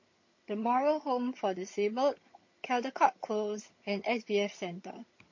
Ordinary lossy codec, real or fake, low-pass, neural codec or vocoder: MP3, 32 kbps; fake; 7.2 kHz; vocoder, 22.05 kHz, 80 mel bands, HiFi-GAN